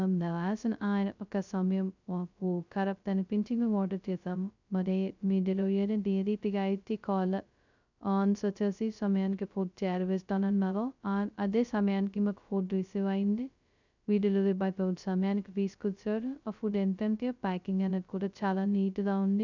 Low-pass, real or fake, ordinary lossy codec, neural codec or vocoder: 7.2 kHz; fake; none; codec, 16 kHz, 0.2 kbps, FocalCodec